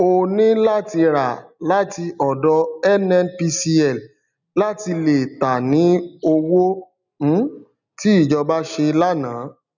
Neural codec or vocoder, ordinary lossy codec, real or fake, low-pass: none; none; real; 7.2 kHz